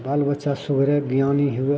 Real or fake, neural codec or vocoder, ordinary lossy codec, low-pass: real; none; none; none